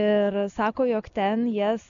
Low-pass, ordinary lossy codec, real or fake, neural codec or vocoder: 7.2 kHz; MP3, 96 kbps; real; none